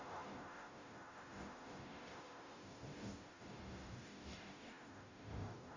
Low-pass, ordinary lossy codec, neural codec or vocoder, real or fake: 7.2 kHz; AAC, 48 kbps; codec, 44.1 kHz, 0.9 kbps, DAC; fake